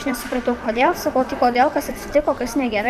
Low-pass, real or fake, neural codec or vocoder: 14.4 kHz; fake; vocoder, 44.1 kHz, 128 mel bands, Pupu-Vocoder